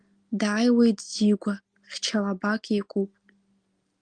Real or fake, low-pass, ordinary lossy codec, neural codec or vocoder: real; 9.9 kHz; Opus, 24 kbps; none